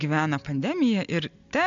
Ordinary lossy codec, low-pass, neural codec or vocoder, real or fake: MP3, 64 kbps; 7.2 kHz; none; real